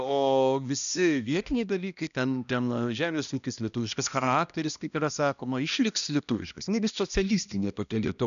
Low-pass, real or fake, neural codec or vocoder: 7.2 kHz; fake; codec, 16 kHz, 1 kbps, X-Codec, HuBERT features, trained on balanced general audio